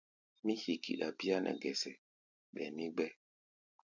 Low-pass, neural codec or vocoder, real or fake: 7.2 kHz; none; real